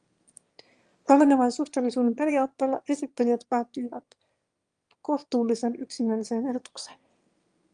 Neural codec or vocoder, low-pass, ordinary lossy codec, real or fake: autoencoder, 22.05 kHz, a latent of 192 numbers a frame, VITS, trained on one speaker; 9.9 kHz; Opus, 32 kbps; fake